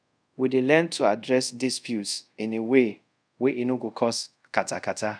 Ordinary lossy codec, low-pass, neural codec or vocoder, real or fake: none; 9.9 kHz; codec, 24 kHz, 0.5 kbps, DualCodec; fake